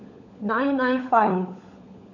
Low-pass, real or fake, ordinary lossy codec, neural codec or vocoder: 7.2 kHz; fake; none; codec, 16 kHz, 16 kbps, FunCodec, trained on LibriTTS, 50 frames a second